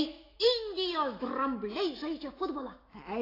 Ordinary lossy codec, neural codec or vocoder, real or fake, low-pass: AAC, 24 kbps; autoencoder, 48 kHz, 128 numbers a frame, DAC-VAE, trained on Japanese speech; fake; 5.4 kHz